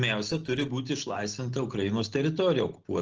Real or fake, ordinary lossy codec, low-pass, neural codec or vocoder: real; Opus, 16 kbps; 7.2 kHz; none